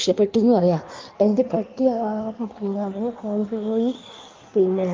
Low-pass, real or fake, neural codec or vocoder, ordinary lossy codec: 7.2 kHz; fake; codec, 16 kHz in and 24 kHz out, 1.1 kbps, FireRedTTS-2 codec; Opus, 32 kbps